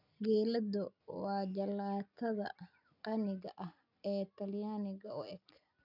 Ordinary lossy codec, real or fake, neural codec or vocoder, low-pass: none; real; none; 5.4 kHz